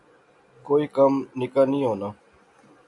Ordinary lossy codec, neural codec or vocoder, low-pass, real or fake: AAC, 64 kbps; none; 10.8 kHz; real